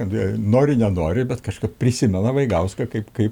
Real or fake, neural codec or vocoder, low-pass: real; none; 19.8 kHz